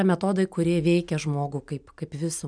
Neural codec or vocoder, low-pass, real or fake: none; 9.9 kHz; real